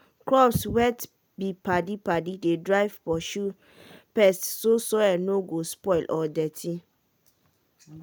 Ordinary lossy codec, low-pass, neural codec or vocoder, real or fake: none; none; none; real